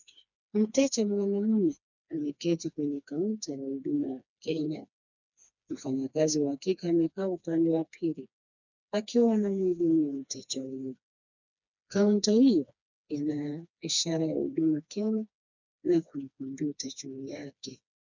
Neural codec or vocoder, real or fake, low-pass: codec, 16 kHz, 2 kbps, FreqCodec, smaller model; fake; 7.2 kHz